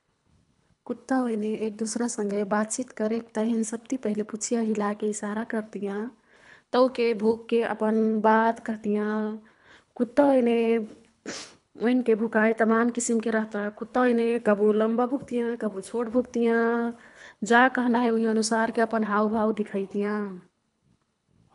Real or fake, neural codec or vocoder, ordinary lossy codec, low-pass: fake; codec, 24 kHz, 3 kbps, HILCodec; none; 10.8 kHz